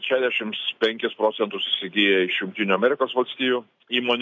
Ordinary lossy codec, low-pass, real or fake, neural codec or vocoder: MP3, 48 kbps; 7.2 kHz; real; none